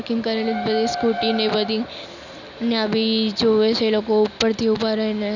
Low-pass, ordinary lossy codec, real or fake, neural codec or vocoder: 7.2 kHz; none; real; none